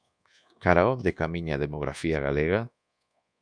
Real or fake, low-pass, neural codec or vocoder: fake; 9.9 kHz; codec, 24 kHz, 1.2 kbps, DualCodec